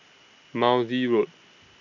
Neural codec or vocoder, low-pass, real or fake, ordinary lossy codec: none; 7.2 kHz; real; none